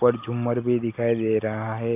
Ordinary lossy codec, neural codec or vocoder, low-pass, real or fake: AAC, 32 kbps; none; 3.6 kHz; real